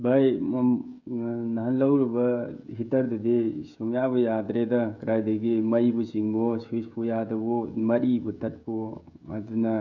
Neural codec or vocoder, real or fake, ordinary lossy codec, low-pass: codec, 16 kHz, 16 kbps, FreqCodec, smaller model; fake; none; 7.2 kHz